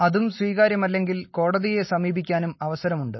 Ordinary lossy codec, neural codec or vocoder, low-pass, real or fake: MP3, 24 kbps; none; 7.2 kHz; real